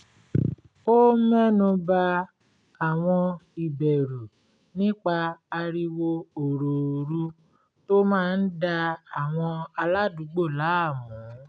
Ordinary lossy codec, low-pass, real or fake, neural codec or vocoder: none; 9.9 kHz; real; none